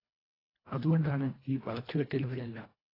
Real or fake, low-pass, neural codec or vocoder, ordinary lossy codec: fake; 5.4 kHz; codec, 24 kHz, 1.5 kbps, HILCodec; AAC, 24 kbps